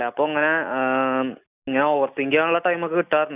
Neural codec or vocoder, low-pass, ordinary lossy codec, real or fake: none; 3.6 kHz; none; real